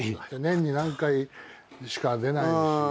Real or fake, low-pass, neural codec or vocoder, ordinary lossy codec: real; none; none; none